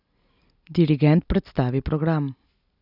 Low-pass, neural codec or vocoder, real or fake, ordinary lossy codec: 5.4 kHz; none; real; none